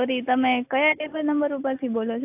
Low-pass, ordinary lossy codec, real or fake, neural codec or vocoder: 3.6 kHz; AAC, 32 kbps; real; none